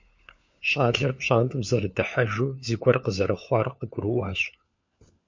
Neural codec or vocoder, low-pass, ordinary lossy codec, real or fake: codec, 16 kHz, 4 kbps, FunCodec, trained on LibriTTS, 50 frames a second; 7.2 kHz; MP3, 48 kbps; fake